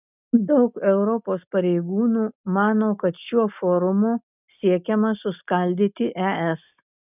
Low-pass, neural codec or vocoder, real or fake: 3.6 kHz; none; real